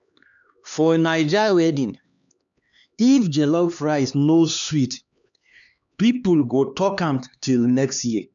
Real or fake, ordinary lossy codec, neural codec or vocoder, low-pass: fake; none; codec, 16 kHz, 2 kbps, X-Codec, HuBERT features, trained on LibriSpeech; 7.2 kHz